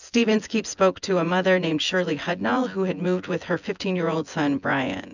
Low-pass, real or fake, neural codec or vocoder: 7.2 kHz; fake; vocoder, 24 kHz, 100 mel bands, Vocos